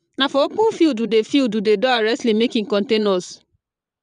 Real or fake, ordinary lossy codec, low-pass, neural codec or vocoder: fake; none; 9.9 kHz; vocoder, 22.05 kHz, 80 mel bands, Vocos